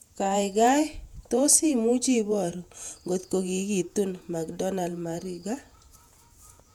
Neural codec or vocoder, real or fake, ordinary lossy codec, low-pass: vocoder, 48 kHz, 128 mel bands, Vocos; fake; MP3, 96 kbps; 19.8 kHz